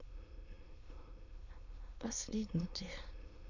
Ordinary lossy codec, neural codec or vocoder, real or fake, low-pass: none; autoencoder, 22.05 kHz, a latent of 192 numbers a frame, VITS, trained on many speakers; fake; 7.2 kHz